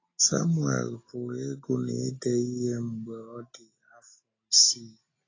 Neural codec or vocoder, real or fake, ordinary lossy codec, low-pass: none; real; AAC, 32 kbps; 7.2 kHz